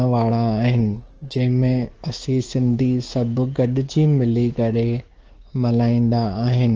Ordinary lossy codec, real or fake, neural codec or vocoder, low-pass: Opus, 16 kbps; fake; codec, 16 kHz, 6 kbps, DAC; 7.2 kHz